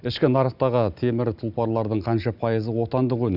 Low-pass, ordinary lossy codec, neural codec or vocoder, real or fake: 5.4 kHz; Opus, 64 kbps; none; real